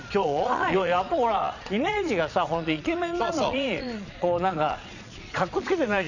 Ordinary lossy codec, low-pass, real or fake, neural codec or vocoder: none; 7.2 kHz; fake; vocoder, 22.05 kHz, 80 mel bands, Vocos